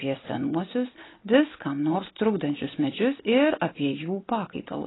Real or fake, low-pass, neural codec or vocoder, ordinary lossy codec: real; 7.2 kHz; none; AAC, 16 kbps